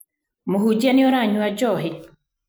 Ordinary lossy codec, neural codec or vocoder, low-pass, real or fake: none; none; none; real